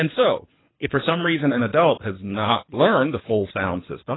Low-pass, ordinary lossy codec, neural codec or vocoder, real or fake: 7.2 kHz; AAC, 16 kbps; codec, 24 kHz, 3 kbps, HILCodec; fake